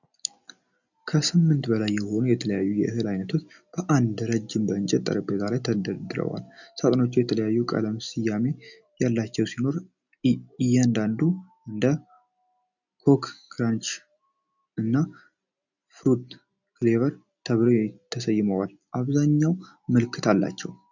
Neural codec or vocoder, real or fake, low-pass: none; real; 7.2 kHz